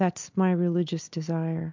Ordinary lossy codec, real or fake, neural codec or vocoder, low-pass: MP3, 64 kbps; real; none; 7.2 kHz